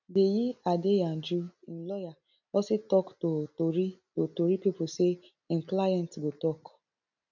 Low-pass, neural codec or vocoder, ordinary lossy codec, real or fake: 7.2 kHz; none; none; real